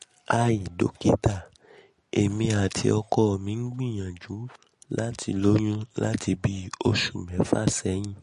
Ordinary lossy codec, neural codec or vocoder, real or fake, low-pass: MP3, 48 kbps; none; real; 10.8 kHz